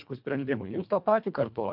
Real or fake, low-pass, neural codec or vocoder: fake; 5.4 kHz; codec, 24 kHz, 1.5 kbps, HILCodec